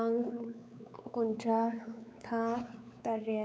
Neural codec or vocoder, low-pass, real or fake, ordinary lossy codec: codec, 16 kHz, 4 kbps, X-Codec, WavLM features, trained on Multilingual LibriSpeech; none; fake; none